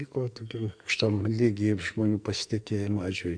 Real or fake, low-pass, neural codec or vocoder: fake; 9.9 kHz; codec, 44.1 kHz, 2.6 kbps, SNAC